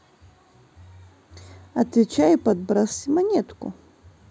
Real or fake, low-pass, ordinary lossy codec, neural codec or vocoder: real; none; none; none